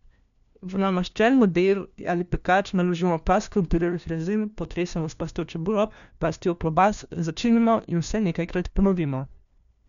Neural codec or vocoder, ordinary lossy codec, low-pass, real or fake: codec, 16 kHz, 1 kbps, FunCodec, trained on LibriTTS, 50 frames a second; none; 7.2 kHz; fake